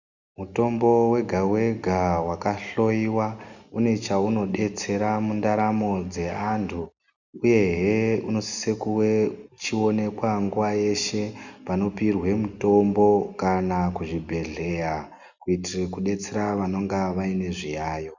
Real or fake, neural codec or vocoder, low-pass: real; none; 7.2 kHz